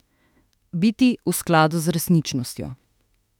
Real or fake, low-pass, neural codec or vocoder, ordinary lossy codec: fake; 19.8 kHz; autoencoder, 48 kHz, 32 numbers a frame, DAC-VAE, trained on Japanese speech; none